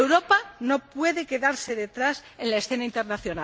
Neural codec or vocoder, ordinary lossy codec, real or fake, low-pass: none; none; real; none